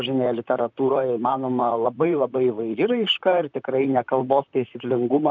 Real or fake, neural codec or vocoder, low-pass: fake; vocoder, 44.1 kHz, 128 mel bands, Pupu-Vocoder; 7.2 kHz